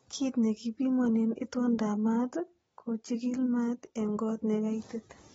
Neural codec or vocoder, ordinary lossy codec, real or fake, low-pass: none; AAC, 24 kbps; real; 19.8 kHz